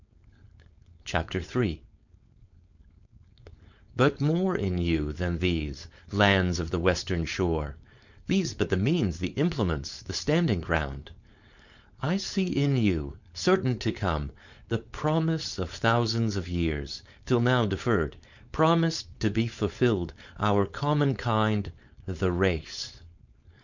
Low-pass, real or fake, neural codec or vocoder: 7.2 kHz; fake; codec, 16 kHz, 4.8 kbps, FACodec